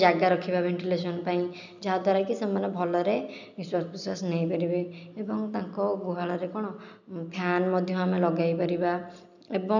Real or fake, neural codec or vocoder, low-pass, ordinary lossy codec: real; none; 7.2 kHz; none